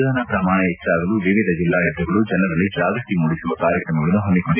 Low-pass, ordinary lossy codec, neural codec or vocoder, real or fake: 3.6 kHz; none; none; real